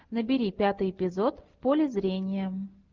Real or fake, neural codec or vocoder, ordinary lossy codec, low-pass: real; none; Opus, 32 kbps; 7.2 kHz